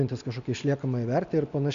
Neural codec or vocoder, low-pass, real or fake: none; 7.2 kHz; real